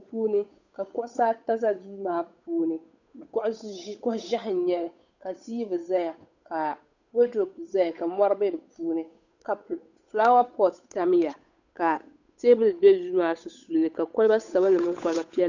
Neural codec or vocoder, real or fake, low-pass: codec, 16 kHz, 8 kbps, FunCodec, trained on Chinese and English, 25 frames a second; fake; 7.2 kHz